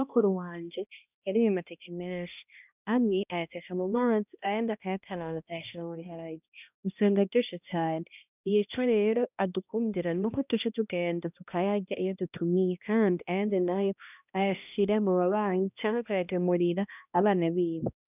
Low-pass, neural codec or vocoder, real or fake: 3.6 kHz; codec, 16 kHz, 1 kbps, X-Codec, HuBERT features, trained on balanced general audio; fake